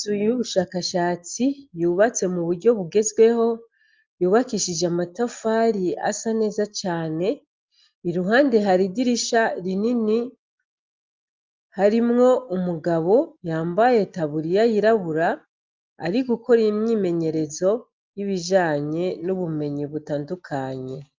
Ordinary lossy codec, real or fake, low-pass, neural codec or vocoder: Opus, 24 kbps; real; 7.2 kHz; none